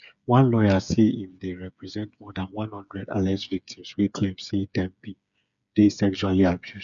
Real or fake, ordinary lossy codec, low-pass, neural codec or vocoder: fake; none; 7.2 kHz; codec, 16 kHz, 8 kbps, FreqCodec, smaller model